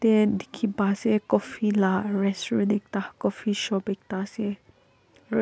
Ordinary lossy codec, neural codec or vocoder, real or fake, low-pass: none; none; real; none